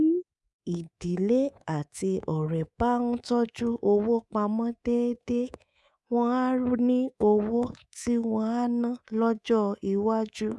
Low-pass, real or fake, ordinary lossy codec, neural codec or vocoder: none; fake; none; codec, 24 kHz, 3.1 kbps, DualCodec